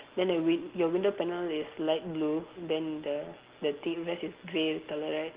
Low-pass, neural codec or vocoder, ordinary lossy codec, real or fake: 3.6 kHz; none; Opus, 16 kbps; real